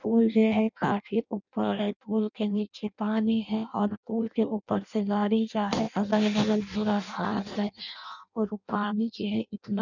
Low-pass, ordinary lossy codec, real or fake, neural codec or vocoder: 7.2 kHz; none; fake; codec, 16 kHz in and 24 kHz out, 0.6 kbps, FireRedTTS-2 codec